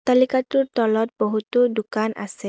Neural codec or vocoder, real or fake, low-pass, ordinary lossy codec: none; real; none; none